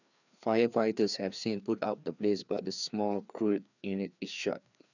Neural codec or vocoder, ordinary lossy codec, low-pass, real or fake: codec, 16 kHz, 2 kbps, FreqCodec, larger model; none; 7.2 kHz; fake